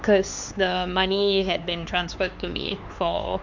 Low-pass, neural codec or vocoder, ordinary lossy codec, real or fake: 7.2 kHz; codec, 16 kHz, 4 kbps, X-Codec, HuBERT features, trained on LibriSpeech; MP3, 64 kbps; fake